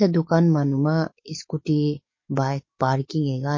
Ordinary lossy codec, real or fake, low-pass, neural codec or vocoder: MP3, 32 kbps; fake; 7.2 kHz; codec, 44.1 kHz, 7.8 kbps, DAC